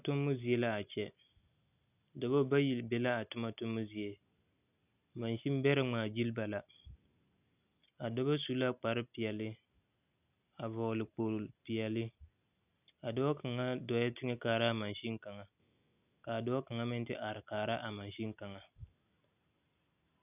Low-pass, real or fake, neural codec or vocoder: 3.6 kHz; real; none